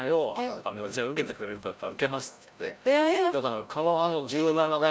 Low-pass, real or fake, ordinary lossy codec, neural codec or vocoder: none; fake; none; codec, 16 kHz, 0.5 kbps, FreqCodec, larger model